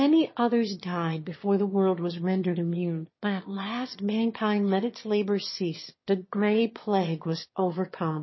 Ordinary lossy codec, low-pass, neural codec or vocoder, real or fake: MP3, 24 kbps; 7.2 kHz; autoencoder, 22.05 kHz, a latent of 192 numbers a frame, VITS, trained on one speaker; fake